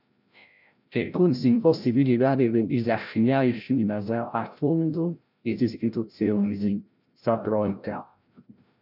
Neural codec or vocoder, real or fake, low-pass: codec, 16 kHz, 0.5 kbps, FreqCodec, larger model; fake; 5.4 kHz